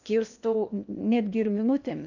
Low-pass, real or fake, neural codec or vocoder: 7.2 kHz; fake; codec, 16 kHz in and 24 kHz out, 0.8 kbps, FocalCodec, streaming, 65536 codes